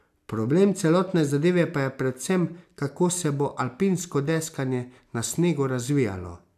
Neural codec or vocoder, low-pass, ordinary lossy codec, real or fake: none; 14.4 kHz; AAC, 96 kbps; real